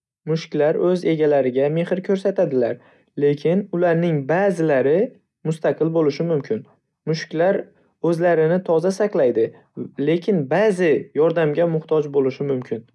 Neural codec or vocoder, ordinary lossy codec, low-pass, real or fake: none; none; none; real